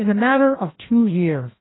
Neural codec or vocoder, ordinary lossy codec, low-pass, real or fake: codec, 16 kHz, 1 kbps, FreqCodec, larger model; AAC, 16 kbps; 7.2 kHz; fake